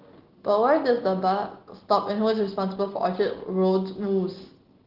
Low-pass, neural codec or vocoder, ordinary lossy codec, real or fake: 5.4 kHz; none; Opus, 16 kbps; real